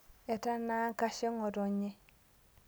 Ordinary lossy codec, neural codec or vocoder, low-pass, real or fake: none; none; none; real